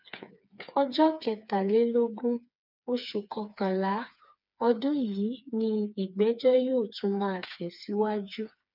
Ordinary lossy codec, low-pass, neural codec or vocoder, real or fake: none; 5.4 kHz; codec, 16 kHz, 4 kbps, FreqCodec, smaller model; fake